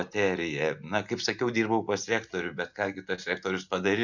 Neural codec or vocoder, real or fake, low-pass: none; real; 7.2 kHz